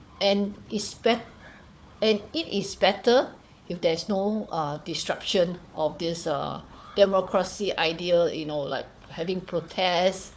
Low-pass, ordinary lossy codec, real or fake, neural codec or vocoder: none; none; fake; codec, 16 kHz, 4 kbps, FunCodec, trained on Chinese and English, 50 frames a second